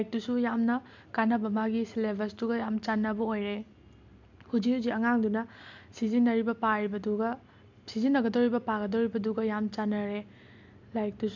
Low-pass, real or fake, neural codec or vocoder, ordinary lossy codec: 7.2 kHz; real; none; MP3, 64 kbps